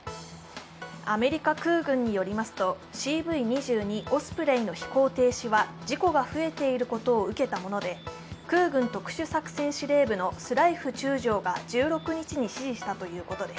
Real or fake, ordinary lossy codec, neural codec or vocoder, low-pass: real; none; none; none